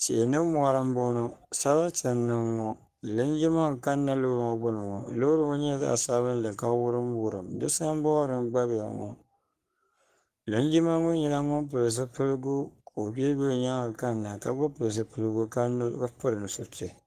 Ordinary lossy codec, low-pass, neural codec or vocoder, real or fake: Opus, 24 kbps; 14.4 kHz; codec, 44.1 kHz, 3.4 kbps, Pupu-Codec; fake